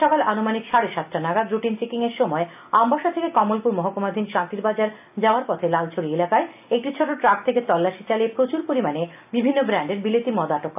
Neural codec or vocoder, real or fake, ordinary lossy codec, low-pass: none; real; AAC, 32 kbps; 3.6 kHz